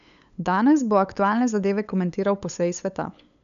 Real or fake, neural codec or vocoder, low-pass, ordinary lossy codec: fake; codec, 16 kHz, 8 kbps, FunCodec, trained on LibriTTS, 25 frames a second; 7.2 kHz; MP3, 96 kbps